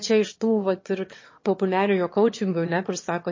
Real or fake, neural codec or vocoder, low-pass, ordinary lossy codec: fake; autoencoder, 22.05 kHz, a latent of 192 numbers a frame, VITS, trained on one speaker; 7.2 kHz; MP3, 32 kbps